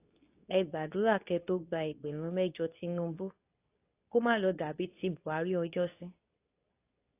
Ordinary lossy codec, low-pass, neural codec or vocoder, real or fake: AAC, 24 kbps; 3.6 kHz; codec, 24 kHz, 0.9 kbps, WavTokenizer, medium speech release version 2; fake